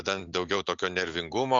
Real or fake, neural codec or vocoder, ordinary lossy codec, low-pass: real; none; AAC, 64 kbps; 7.2 kHz